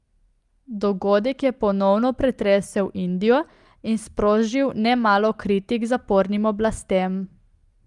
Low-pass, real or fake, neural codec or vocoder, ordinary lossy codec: 10.8 kHz; real; none; Opus, 32 kbps